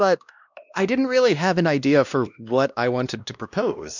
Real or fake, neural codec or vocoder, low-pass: fake; codec, 16 kHz, 1 kbps, X-Codec, WavLM features, trained on Multilingual LibriSpeech; 7.2 kHz